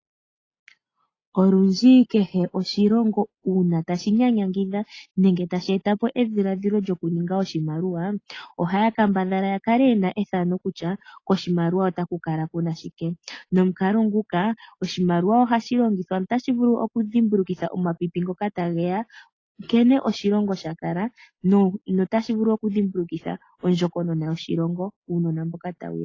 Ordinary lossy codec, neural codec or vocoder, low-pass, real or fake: AAC, 32 kbps; none; 7.2 kHz; real